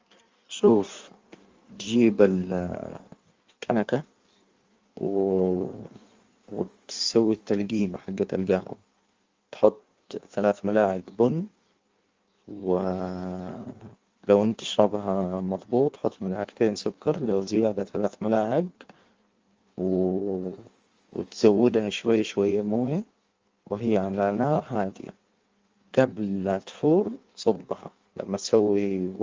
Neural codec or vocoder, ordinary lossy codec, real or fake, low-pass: codec, 16 kHz in and 24 kHz out, 1.1 kbps, FireRedTTS-2 codec; Opus, 32 kbps; fake; 7.2 kHz